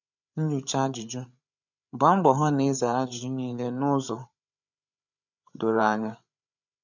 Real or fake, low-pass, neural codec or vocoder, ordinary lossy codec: fake; 7.2 kHz; codec, 16 kHz, 8 kbps, FreqCodec, larger model; none